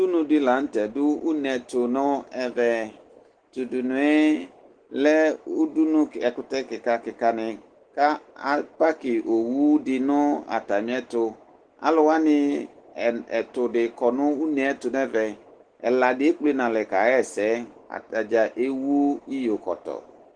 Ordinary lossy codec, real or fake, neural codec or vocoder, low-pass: Opus, 16 kbps; real; none; 9.9 kHz